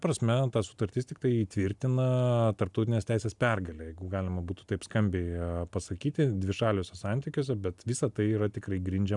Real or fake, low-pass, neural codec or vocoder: real; 10.8 kHz; none